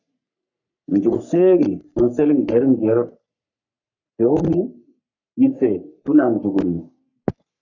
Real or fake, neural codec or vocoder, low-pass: fake; codec, 44.1 kHz, 3.4 kbps, Pupu-Codec; 7.2 kHz